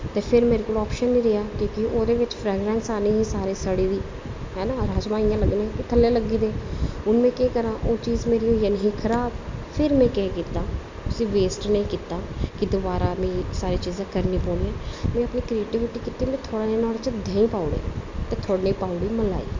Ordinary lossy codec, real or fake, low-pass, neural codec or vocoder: MP3, 64 kbps; real; 7.2 kHz; none